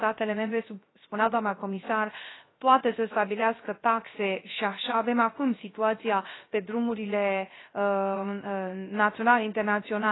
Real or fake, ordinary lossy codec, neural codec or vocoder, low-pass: fake; AAC, 16 kbps; codec, 16 kHz, 0.3 kbps, FocalCodec; 7.2 kHz